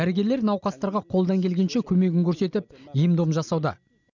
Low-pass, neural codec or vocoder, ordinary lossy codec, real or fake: 7.2 kHz; none; none; real